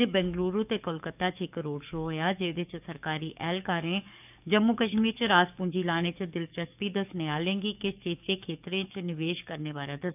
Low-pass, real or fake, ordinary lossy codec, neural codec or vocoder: 3.6 kHz; fake; none; codec, 44.1 kHz, 7.8 kbps, DAC